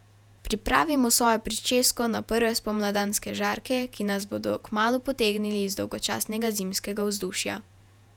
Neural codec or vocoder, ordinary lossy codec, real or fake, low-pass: vocoder, 48 kHz, 128 mel bands, Vocos; none; fake; 19.8 kHz